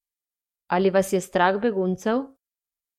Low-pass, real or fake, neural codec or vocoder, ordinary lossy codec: 19.8 kHz; fake; codec, 44.1 kHz, 7.8 kbps, DAC; MP3, 64 kbps